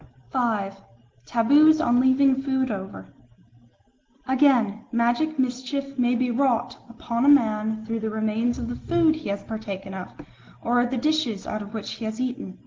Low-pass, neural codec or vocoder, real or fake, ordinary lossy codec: 7.2 kHz; none; real; Opus, 16 kbps